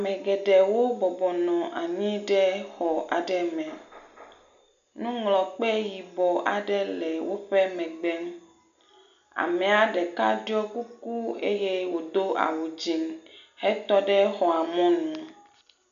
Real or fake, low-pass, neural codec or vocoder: real; 7.2 kHz; none